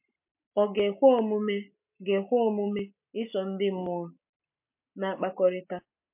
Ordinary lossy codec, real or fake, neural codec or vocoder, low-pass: MP3, 32 kbps; real; none; 3.6 kHz